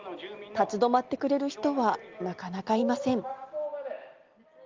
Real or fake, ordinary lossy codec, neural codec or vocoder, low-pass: real; Opus, 32 kbps; none; 7.2 kHz